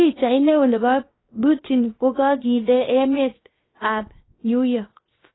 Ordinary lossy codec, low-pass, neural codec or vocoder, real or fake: AAC, 16 kbps; 7.2 kHz; codec, 16 kHz, 0.7 kbps, FocalCodec; fake